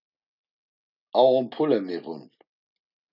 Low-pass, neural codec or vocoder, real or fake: 5.4 kHz; none; real